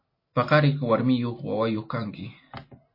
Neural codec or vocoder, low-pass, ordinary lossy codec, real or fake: none; 5.4 kHz; MP3, 24 kbps; real